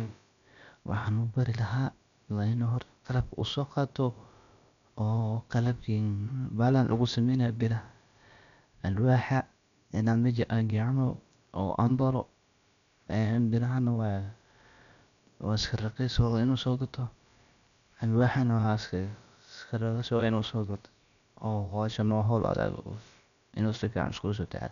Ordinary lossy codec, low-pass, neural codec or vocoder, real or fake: none; 7.2 kHz; codec, 16 kHz, about 1 kbps, DyCAST, with the encoder's durations; fake